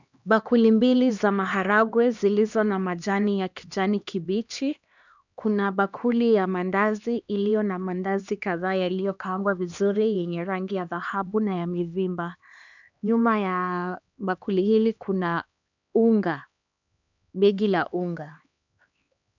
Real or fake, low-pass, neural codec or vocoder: fake; 7.2 kHz; codec, 16 kHz, 2 kbps, X-Codec, HuBERT features, trained on LibriSpeech